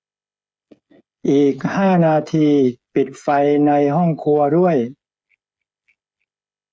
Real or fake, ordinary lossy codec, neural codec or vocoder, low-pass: fake; none; codec, 16 kHz, 8 kbps, FreqCodec, smaller model; none